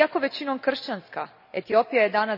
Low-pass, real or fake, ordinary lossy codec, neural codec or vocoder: 5.4 kHz; real; none; none